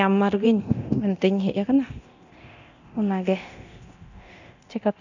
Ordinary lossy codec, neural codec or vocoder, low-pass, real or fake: none; codec, 24 kHz, 0.9 kbps, DualCodec; 7.2 kHz; fake